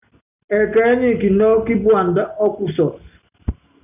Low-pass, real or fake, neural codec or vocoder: 3.6 kHz; real; none